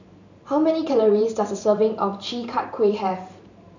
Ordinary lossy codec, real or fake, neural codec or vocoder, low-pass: none; fake; vocoder, 44.1 kHz, 128 mel bands every 512 samples, BigVGAN v2; 7.2 kHz